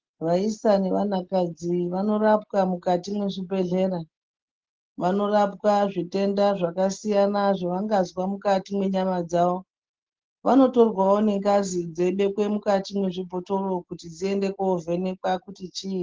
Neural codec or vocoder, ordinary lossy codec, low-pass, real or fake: none; Opus, 16 kbps; 7.2 kHz; real